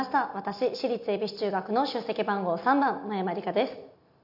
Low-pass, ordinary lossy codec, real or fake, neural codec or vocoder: 5.4 kHz; none; real; none